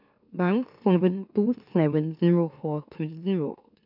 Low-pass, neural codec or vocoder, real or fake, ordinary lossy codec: 5.4 kHz; autoencoder, 44.1 kHz, a latent of 192 numbers a frame, MeloTTS; fake; none